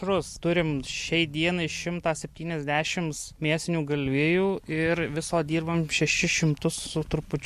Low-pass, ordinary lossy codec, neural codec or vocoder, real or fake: 14.4 kHz; MP3, 64 kbps; none; real